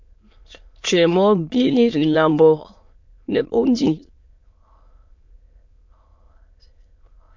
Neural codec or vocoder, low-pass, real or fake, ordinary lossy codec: autoencoder, 22.05 kHz, a latent of 192 numbers a frame, VITS, trained on many speakers; 7.2 kHz; fake; MP3, 48 kbps